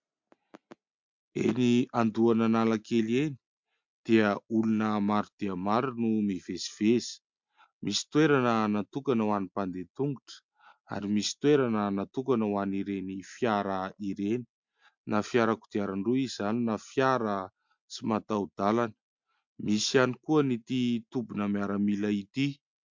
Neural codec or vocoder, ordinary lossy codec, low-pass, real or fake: none; MP3, 64 kbps; 7.2 kHz; real